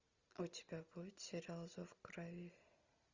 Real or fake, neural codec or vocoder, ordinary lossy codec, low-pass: real; none; Opus, 64 kbps; 7.2 kHz